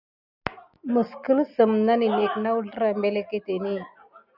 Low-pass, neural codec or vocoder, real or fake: 5.4 kHz; none; real